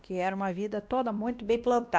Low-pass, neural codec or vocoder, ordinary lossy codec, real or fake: none; codec, 16 kHz, 1 kbps, X-Codec, WavLM features, trained on Multilingual LibriSpeech; none; fake